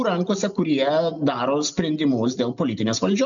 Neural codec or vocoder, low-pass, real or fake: none; 7.2 kHz; real